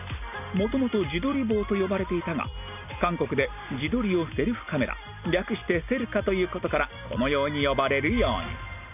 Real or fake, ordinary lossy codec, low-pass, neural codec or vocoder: real; none; 3.6 kHz; none